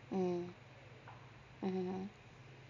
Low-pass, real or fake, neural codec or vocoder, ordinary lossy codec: 7.2 kHz; real; none; MP3, 64 kbps